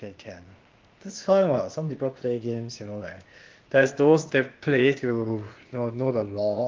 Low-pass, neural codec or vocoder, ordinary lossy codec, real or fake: 7.2 kHz; codec, 16 kHz, 0.8 kbps, ZipCodec; Opus, 32 kbps; fake